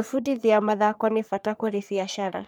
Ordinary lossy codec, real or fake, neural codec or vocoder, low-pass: none; fake; codec, 44.1 kHz, 3.4 kbps, Pupu-Codec; none